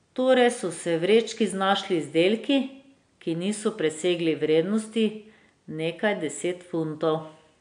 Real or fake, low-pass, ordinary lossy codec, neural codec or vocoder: real; 9.9 kHz; AAC, 64 kbps; none